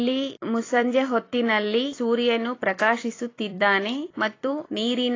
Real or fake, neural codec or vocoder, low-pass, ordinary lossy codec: fake; vocoder, 44.1 kHz, 128 mel bands every 256 samples, BigVGAN v2; 7.2 kHz; AAC, 32 kbps